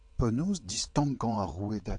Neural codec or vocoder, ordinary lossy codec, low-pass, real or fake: vocoder, 22.05 kHz, 80 mel bands, WaveNeXt; AAC, 64 kbps; 9.9 kHz; fake